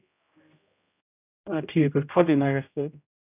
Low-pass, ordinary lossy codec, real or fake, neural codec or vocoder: 3.6 kHz; none; fake; codec, 16 kHz, 0.5 kbps, X-Codec, HuBERT features, trained on general audio